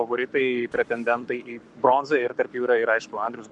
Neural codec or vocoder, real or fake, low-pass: codec, 44.1 kHz, 7.8 kbps, Pupu-Codec; fake; 10.8 kHz